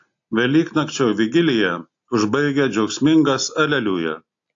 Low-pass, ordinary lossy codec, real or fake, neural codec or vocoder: 7.2 kHz; AAC, 48 kbps; real; none